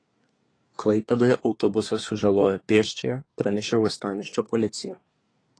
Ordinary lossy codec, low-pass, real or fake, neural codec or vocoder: AAC, 32 kbps; 9.9 kHz; fake; codec, 24 kHz, 1 kbps, SNAC